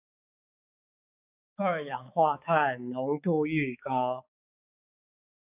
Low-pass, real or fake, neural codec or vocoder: 3.6 kHz; fake; codec, 16 kHz, 4 kbps, X-Codec, HuBERT features, trained on balanced general audio